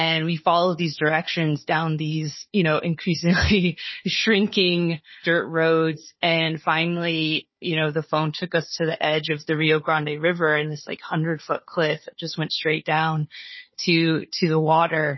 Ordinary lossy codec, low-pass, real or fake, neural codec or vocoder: MP3, 24 kbps; 7.2 kHz; fake; codec, 16 kHz, 4 kbps, FunCodec, trained on Chinese and English, 50 frames a second